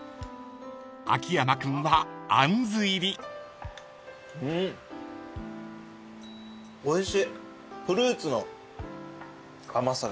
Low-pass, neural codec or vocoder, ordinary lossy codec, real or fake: none; none; none; real